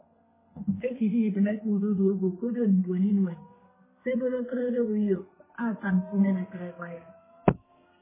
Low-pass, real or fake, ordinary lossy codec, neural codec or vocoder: 3.6 kHz; fake; MP3, 16 kbps; codec, 32 kHz, 1.9 kbps, SNAC